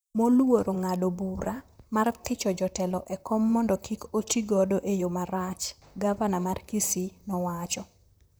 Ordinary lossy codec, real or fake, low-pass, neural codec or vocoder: none; fake; none; vocoder, 44.1 kHz, 128 mel bands, Pupu-Vocoder